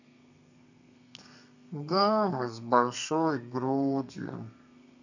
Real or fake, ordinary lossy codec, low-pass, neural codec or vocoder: fake; none; 7.2 kHz; codec, 32 kHz, 1.9 kbps, SNAC